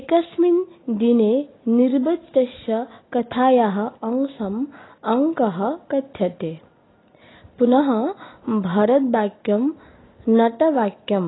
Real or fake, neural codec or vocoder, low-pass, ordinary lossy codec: real; none; 7.2 kHz; AAC, 16 kbps